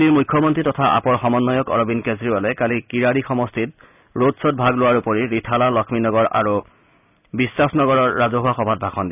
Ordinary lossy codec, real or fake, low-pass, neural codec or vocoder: none; real; 3.6 kHz; none